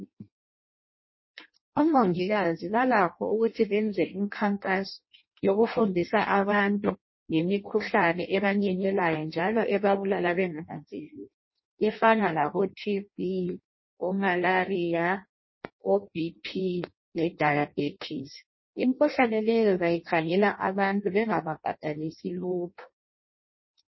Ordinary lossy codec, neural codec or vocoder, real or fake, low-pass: MP3, 24 kbps; codec, 16 kHz in and 24 kHz out, 0.6 kbps, FireRedTTS-2 codec; fake; 7.2 kHz